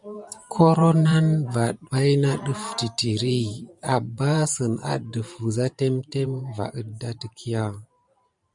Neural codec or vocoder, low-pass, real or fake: vocoder, 44.1 kHz, 128 mel bands every 512 samples, BigVGAN v2; 10.8 kHz; fake